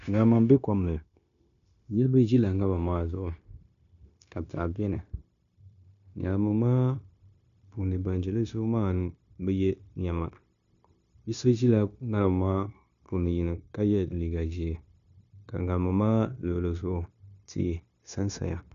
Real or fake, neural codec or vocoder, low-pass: fake; codec, 16 kHz, 0.9 kbps, LongCat-Audio-Codec; 7.2 kHz